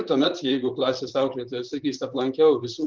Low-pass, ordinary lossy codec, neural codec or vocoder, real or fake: 7.2 kHz; Opus, 32 kbps; vocoder, 44.1 kHz, 128 mel bands, Pupu-Vocoder; fake